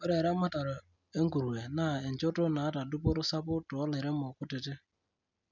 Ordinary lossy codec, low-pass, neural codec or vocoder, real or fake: none; 7.2 kHz; none; real